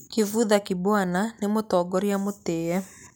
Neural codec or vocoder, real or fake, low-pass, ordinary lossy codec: none; real; none; none